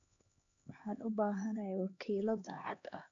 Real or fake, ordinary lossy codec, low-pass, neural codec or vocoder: fake; none; 7.2 kHz; codec, 16 kHz, 2 kbps, X-Codec, HuBERT features, trained on LibriSpeech